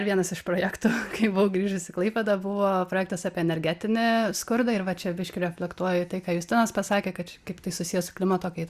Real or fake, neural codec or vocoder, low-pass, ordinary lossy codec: real; none; 14.4 kHz; Opus, 64 kbps